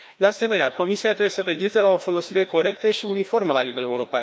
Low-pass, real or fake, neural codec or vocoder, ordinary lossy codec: none; fake; codec, 16 kHz, 1 kbps, FreqCodec, larger model; none